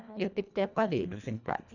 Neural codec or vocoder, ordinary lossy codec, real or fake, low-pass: codec, 24 kHz, 1.5 kbps, HILCodec; none; fake; 7.2 kHz